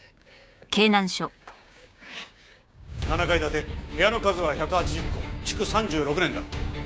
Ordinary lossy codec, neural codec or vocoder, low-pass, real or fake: none; codec, 16 kHz, 6 kbps, DAC; none; fake